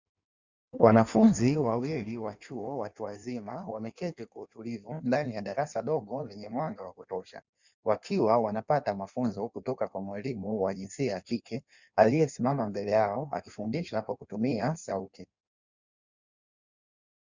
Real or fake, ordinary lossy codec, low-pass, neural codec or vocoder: fake; Opus, 64 kbps; 7.2 kHz; codec, 16 kHz in and 24 kHz out, 1.1 kbps, FireRedTTS-2 codec